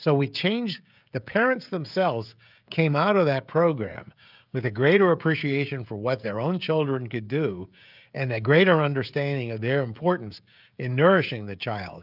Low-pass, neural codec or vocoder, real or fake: 5.4 kHz; codec, 16 kHz, 16 kbps, FreqCodec, smaller model; fake